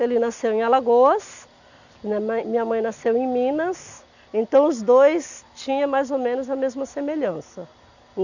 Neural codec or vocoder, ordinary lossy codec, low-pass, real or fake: none; none; 7.2 kHz; real